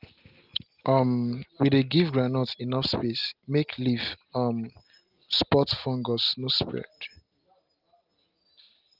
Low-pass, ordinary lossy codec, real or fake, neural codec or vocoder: 5.4 kHz; Opus, 24 kbps; real; none